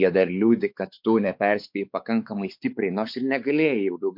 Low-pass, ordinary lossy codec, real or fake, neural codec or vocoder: 5.4 kHz; MP3, 48 kbps; fake; codec, 16 kHz, 4 kbps, X-Codec, WavLM features, trained on Multilingual LibriSpeech